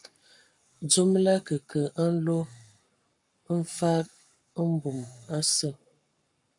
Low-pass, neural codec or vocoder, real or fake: 10.8 kHz; codec, 44.1 kHz, 7.8 kbps, Pupu-Codec; fake